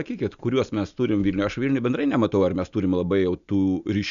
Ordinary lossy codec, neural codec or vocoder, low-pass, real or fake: MP3, 96 kbps; none; 7.2 kHz; real